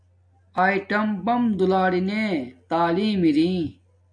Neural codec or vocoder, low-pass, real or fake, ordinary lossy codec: none; 9.9 kHz; real; MP3, 64 kbps